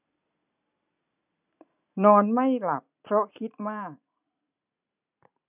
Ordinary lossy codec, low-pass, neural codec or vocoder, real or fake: none; 3.6 kHz; none; real